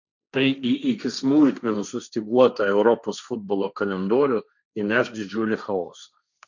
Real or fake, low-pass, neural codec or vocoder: fake; 7.2 kHz; codec, 16 kHz, 1.1 kbps, Voila-Tokenizer